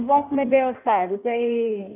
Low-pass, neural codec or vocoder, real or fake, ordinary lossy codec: 3.6 kHz; codec, 16 kHz in and 24 kHz out, 1.1 kbps, FireRedTTS-2 codec; fake; none